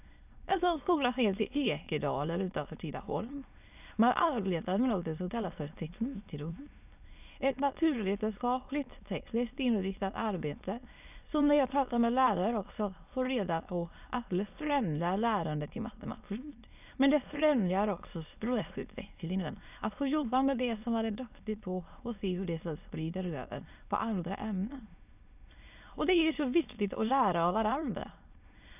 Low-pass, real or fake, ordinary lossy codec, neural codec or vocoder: 3.6 kHz; fake; none; autoencoder, 22.05 kHz, a latent of 192 numbers a frame, VITS, trained on many speakers